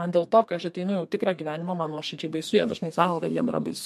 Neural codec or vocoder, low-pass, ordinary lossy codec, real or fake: codec, 32 kHz, 1.9 kbps, SNAC; 14.4 kHz; MP3, 64 kbps; fake